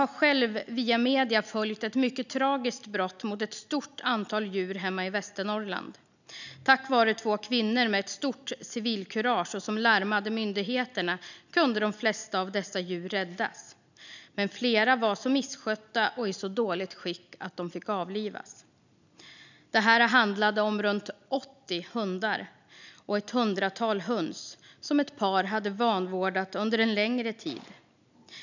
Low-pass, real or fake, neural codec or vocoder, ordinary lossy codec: 7.2 kHz; real; none; none